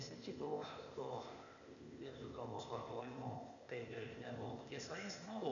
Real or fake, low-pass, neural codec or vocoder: fake; 7.2 kHz; codec, 16 kHz, 0.8 kbps, ZipCodec